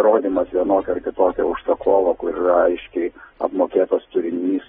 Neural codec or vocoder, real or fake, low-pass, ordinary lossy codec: none; real; 14.4 kHz; AAC, 16 kbps